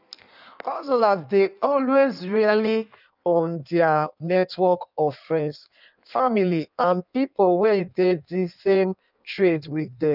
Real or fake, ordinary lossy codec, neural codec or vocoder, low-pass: fake; none; codec, 16 kHz in and 24 kHz out, 1.1 kbps, FireRedTTS-2 codec; 5.4 kHz